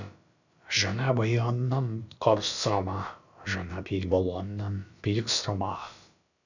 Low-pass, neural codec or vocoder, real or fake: 7.2 kHz; codec, 16 kHz, about 1 kbps, DyCAST, with the encoder's durations; fake